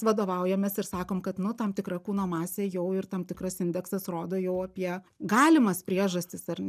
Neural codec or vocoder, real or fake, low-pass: none; real; 14.4 kHz